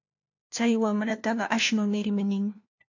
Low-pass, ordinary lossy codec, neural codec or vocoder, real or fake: 7.2 kHz; AAC, 48 kbps; codec, 16 kHz, 1 kbps, FunCodec, trained on LibriTTS, 50 frames a second; fake